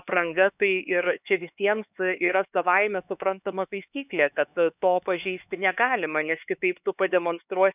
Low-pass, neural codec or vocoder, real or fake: 3.6 kHz; codec, 16 kHz, 4 kbps, X-Codec, HuBERT features, trained on LibriSpeech; fake